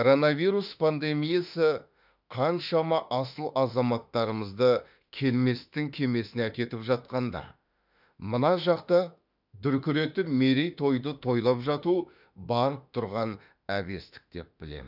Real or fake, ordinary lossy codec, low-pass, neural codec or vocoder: fake; none; 5.4 kHz; autoencoder, 48 kHz, 32 numbers a frame, DAC-VAE, trained on Japanese speech